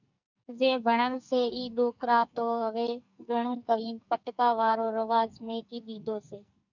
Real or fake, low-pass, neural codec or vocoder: fake; 7.2 kHz; codec, 44.1 kHz, 2.6 kbps, SNAC